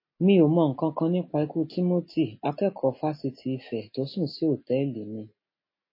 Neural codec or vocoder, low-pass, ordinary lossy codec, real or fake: none; 5.4 kHz; MP3, 24 kbps; real